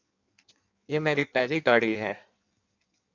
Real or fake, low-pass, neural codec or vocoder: fake; 7.2 kHz; codec, 16 kHz in and 24 kHz out, 1.1 kbps, FireRedTTS-2 codec